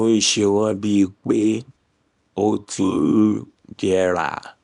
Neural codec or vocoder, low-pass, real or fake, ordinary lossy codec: codec, 24 kHz, 0.9 kbps, WavTokenizer, small release; 10.8 kHz; fake; none